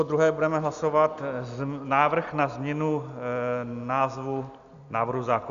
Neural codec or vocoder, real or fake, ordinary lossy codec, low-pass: none; real; Opus, 64 kbps; 7.2 kHz